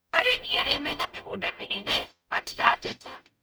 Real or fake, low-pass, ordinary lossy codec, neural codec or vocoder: fake; none; none; codec, 44.1 kHz, 0.9 kbps, DAC